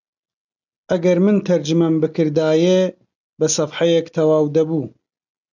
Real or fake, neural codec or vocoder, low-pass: real; none; 7.2 kHz